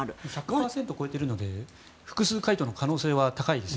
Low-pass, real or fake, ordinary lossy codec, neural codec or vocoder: none; real; none; none